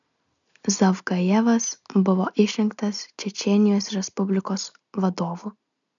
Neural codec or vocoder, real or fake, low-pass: none; real; 7.2 kHz